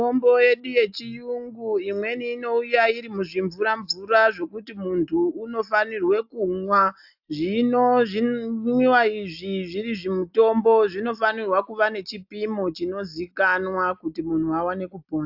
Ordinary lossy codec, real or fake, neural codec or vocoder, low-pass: AAC, 48 kbps; real; none; 5.4 kHz